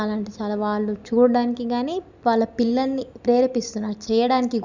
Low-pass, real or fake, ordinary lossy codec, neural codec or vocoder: 7.2 kHz; real; none; none